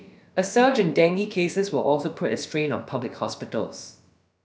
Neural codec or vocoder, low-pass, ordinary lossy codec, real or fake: codec, 16 kHz, about 1 kbps, DyCAST, with the encoder's durations; none; none; fake